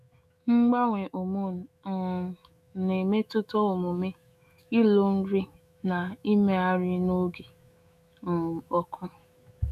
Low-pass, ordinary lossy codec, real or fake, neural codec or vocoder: 14.4 kHz; none; fake; autoencoder, 48 kHz, 128 numbers a frame, DAC-VAE, trained on Japanese speech